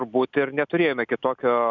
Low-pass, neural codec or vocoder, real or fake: 7.2 kHz; none; real